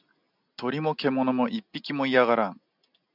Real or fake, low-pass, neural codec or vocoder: real; 5.4 kHz; none